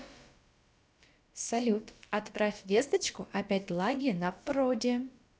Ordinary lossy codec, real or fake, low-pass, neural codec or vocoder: none; fake; none; codec, 16 kHz, about 1 kbps, DyCAST, with the encoder's durations